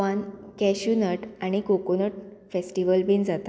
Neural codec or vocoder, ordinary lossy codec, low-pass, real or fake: none; none; none; real